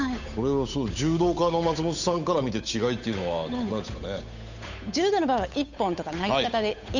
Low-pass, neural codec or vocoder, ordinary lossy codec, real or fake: 7.2 kHz; codec, 16 kHz, 8 kbps, FunCodec, trained on Chinese and English, 25 frames a second; none; fake